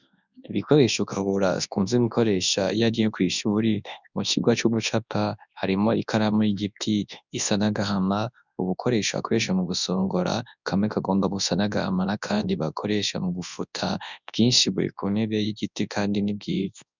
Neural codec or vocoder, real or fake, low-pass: codec, 24 kHz, 0.9 kbps, WavTokenizer, large speech release; fake; 7.2 kHz